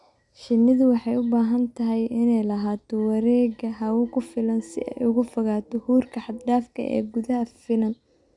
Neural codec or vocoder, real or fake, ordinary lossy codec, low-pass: none; real; none; 10.8 kHz